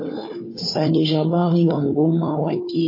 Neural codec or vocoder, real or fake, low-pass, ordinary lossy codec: codec, 16 kHz, 4 kbps, FunCodec, trained on Chinese and English, 50 frames a second; fake; 5.4 kHz; MP3, 24 kbps